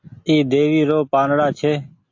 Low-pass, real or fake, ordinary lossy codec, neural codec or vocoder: 7.2 kHz; real; AAC, 48 kbps; none